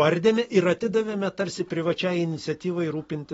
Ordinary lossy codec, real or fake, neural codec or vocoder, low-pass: AAC, 24 kbps; real; none; 19.8 kHz